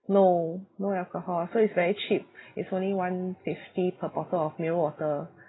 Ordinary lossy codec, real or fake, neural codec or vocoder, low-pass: AAC, 16 kbps; real; none; 7.2 kHz